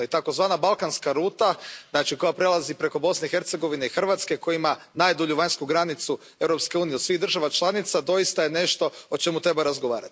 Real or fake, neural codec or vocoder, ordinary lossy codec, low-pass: real; none; none; none